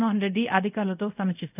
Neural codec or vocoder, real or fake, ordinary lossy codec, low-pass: codec, 24 kHz, 0.5 kbps, DualCodec; fake; none; 3.6 kHz